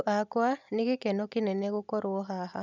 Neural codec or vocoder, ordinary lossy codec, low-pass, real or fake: none; none; 7.2 kHz; real